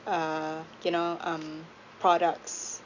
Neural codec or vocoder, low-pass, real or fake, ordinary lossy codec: none; 7.2 kHz; real; none